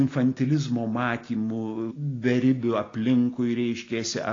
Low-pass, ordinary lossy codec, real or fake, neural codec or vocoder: 7.2 kHz; AAC, 32 kbps; real; none